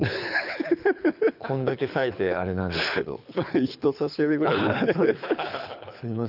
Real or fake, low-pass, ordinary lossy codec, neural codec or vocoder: fake; 5.4 kHz; none; codec, 24 kHz, 6 kbps, HILCodec